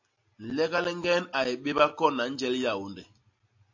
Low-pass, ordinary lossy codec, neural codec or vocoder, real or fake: 7.2 kHz; AAC, 48 kbps; none; real